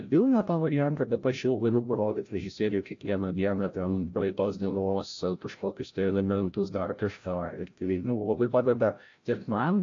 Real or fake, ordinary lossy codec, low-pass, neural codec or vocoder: fake; AAC, 48 kbps; 7.2 kHz; codec, 16 kHz, 0.5 kbps, FreqCodec, larger model